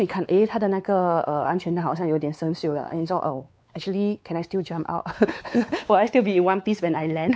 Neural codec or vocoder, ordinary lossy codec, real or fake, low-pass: codec, 16 kHz, 4 kbps, X-Codec, WavLM features, trained on Multilingual LibriSpeech; none; fake; none